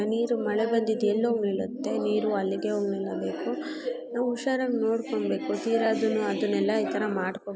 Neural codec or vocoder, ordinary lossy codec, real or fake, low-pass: none; none; real; none